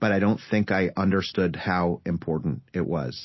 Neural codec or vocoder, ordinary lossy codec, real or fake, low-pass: none; MP3, 24 kbps; real; 7.2 kHz